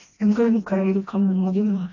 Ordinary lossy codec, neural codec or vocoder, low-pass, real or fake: AAC, 48 kbps; codec, 16 kHz, 1 kbps, FreqCodec, smaller model; 7.2 kHz; fake